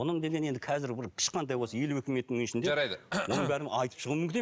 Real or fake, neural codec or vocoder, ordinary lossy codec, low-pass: real; none; none; none